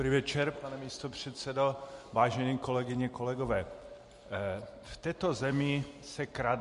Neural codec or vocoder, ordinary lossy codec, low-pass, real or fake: none; MP3, 48 kbps; 14.4 kHz; real